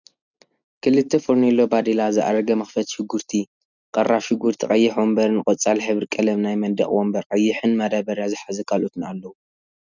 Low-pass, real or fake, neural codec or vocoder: 7.2 kHz; real; none